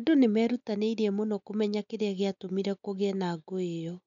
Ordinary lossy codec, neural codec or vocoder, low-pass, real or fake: none; none; 7.2 kHz; real